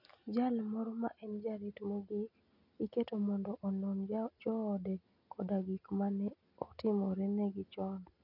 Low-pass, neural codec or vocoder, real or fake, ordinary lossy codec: 5.4 kHz; none; real; none